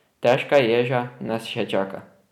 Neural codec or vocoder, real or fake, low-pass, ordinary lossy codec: none; real; 19.8 kHz; none